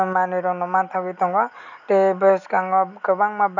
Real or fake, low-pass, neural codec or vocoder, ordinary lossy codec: real; 7.2 kHz; none; none